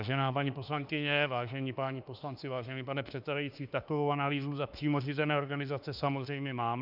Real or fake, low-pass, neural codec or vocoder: fake; 5.4 kHz; autoencoder, 48 kHz, 32 numbers a frame, DAC-VAE, trained on Japanese speech